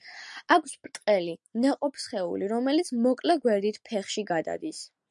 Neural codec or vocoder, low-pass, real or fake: none; 10.8 kHz; real